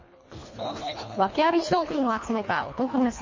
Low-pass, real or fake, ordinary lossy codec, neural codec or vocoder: 7.2 kHz; fake; MP3, 32 kbps; codec, 24 kHz, 1.5 kbps, HILCodec